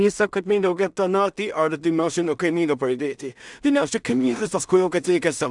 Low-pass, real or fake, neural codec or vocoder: 10.8 kHz; fake; codec, 16 kHz in and 24 kHz out, 0.4 kbps, LongCat-Audio-Codec, two codebook decoder